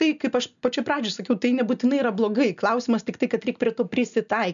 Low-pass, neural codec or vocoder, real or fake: 7.2 kHz; none; real